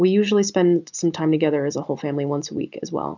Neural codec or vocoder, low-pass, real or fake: none; 7.2 kHz; real